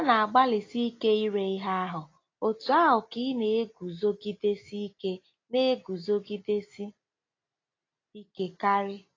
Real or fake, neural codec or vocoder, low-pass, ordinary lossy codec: real; none; 7.2 kHz; AAC, 32 kbps